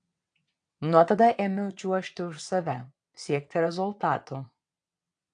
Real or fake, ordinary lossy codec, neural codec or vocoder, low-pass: fake; AAC, 64 kbps; vocoder, 22.05 kHz, 80 mel bands, WaveNeXt; 9.9 kHz